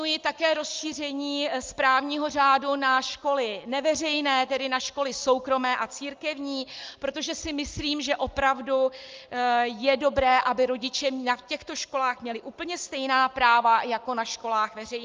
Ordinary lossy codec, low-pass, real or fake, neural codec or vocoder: Opus, 32 kbps; 7.2 kHz; real; none